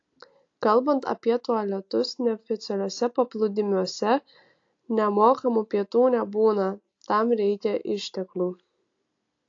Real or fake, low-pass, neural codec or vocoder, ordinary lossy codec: real; 7.2 kHz; none; AAC, 48 kbps